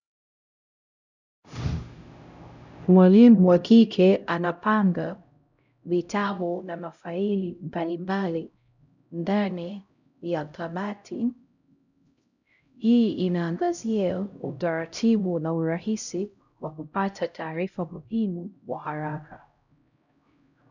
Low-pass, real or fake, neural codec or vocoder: 7.2 kHz; fake; codec, 16 kHz, 0.5 kbps, X-Codec, HuBERT features, trained on LibriSpeech